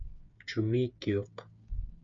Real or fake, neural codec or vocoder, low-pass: fake; codec, 16 kHz, 8 kbps, FreqCodec, smaller model; 7.2 kHz